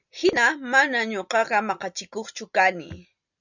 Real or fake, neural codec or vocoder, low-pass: real; none; 7.2 kHz